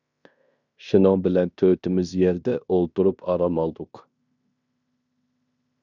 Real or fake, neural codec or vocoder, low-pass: fake; codec, 16 kHz in and 24 kHz out, 0.9 kbps, LongCat-Audio-Codec, fine tuned four codebook decoder; 7.2 kHz